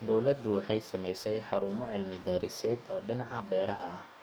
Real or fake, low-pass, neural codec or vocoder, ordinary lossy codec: fake; none; codec, 44.1 kHz, 2.6 kbps, DAC; none